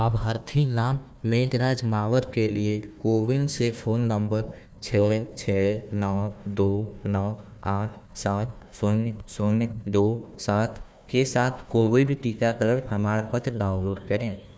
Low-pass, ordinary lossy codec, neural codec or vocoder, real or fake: none; none; codec, 16 kHz, 1 kbps, FunCodec, trained on Chinese and English, 50 frames a second; fake